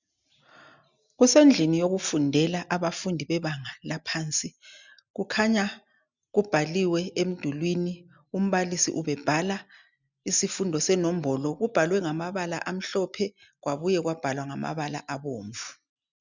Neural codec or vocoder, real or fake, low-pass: none; real; 7.2 kHz